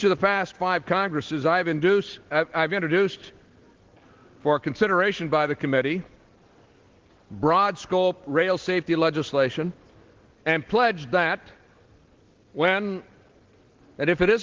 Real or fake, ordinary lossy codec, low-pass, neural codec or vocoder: real; Opus, 16 kbps; 7.2 kHz; none